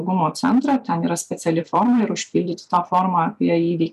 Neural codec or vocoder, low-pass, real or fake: vocoder, 44.1 kHz, 128 mel bands every 256 samples, BigVGAN v2; 14.4 kHz; fake